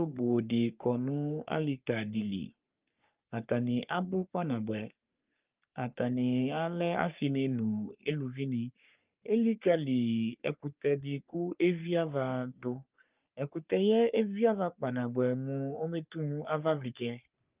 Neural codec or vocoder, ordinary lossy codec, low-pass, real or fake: codec, 44.1 kHz, 3.4 kbps, Pupu-Codec; Opus, 24 kbps; 3.6 kHz; fake